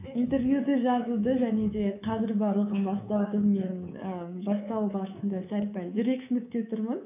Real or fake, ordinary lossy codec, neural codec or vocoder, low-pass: fake; none; codec, 24 kHz, 3.1 kbps, DualCodec; 3.6 kHz